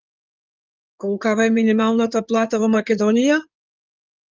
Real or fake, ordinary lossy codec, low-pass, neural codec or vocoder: fake; Opus, 32 kbps; 7.2 kHz; codec, 16 kHz in and 24 kHz out, 2.2 kbps, FireRedTTS-2 codec